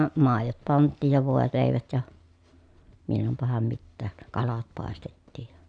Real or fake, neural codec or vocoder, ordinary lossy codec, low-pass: real; none; none; 9.9 kHz